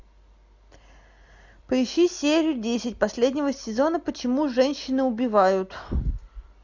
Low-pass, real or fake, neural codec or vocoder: 7.2 kHz; real; none